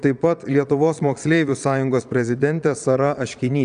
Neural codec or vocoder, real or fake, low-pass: vocoder, 22.05 kHz, 80 mel bands, Vocos; fake; 9.9 kHz